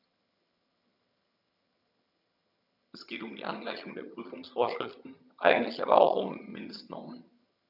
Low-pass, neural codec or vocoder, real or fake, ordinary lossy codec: 5.4 kHz; vocoder, 22.05 kHz, 80 mel bands, HiFi-GAN; fake; none